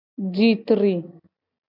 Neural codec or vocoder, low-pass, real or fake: none; 5.4 kHz; real